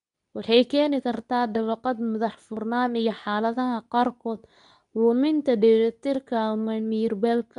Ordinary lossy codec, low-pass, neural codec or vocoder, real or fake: none; 10.8 kHz; codec, 24 kHz, 0.9 kbps, WavTokenizer, medium speech release version 2; fake